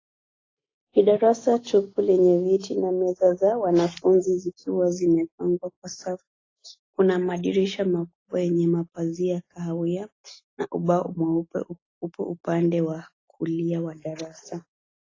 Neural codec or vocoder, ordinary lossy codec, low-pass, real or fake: none; AAC, 32 kbps; 7.2 kHz; real